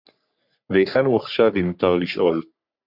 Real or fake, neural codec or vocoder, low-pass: fake; codec, 44.1 kHz, 3.4 kbps, Pupu-Codec; 5.4 kHz